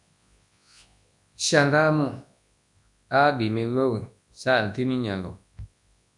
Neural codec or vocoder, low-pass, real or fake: codec, 24 kHz, 0.9 kbps, WavTokenizer, large speech release; 10.8 kHz; fake